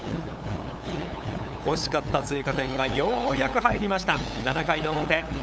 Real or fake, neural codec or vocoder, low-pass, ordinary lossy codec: fake; codec, 16 kHz, 8 kbps, FunCodec, trained on LibriTTS, 25 frames a second; none; none